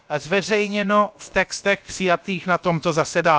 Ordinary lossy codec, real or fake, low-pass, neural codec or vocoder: none; fake; none; codec, 16 kHz, about 1 kbps, DyCAST, with the encoder's durations